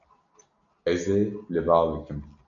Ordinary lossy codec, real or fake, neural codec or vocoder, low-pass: MP3, 96 kbps; real; none; 7.2 kHz